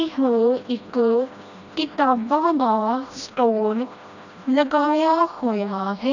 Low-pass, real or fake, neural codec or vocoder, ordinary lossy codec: 7.2 kHz; fake; codec, 16 kHz, 1 kbps, FreqCodec, smaller model; none